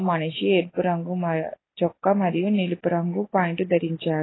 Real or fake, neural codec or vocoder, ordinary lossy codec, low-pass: real; none; AAC, 16 kbps; 7.2 kHz